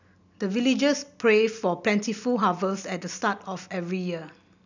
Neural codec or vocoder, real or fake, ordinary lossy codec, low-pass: none; real; none; 7.2 kHz